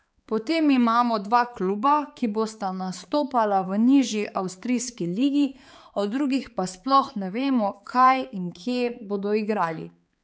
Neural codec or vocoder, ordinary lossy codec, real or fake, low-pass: codec, 16 kHz, 4 kbps, X-Codec, HuBERT features, trained on balanced general audio; none; fake; none